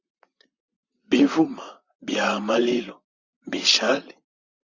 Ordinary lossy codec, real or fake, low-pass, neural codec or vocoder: Opus, 64 kbps; fake; 7.2 kHz; vocoder, 44.1 kHz, 128 mel bands, Pupu-Vocoder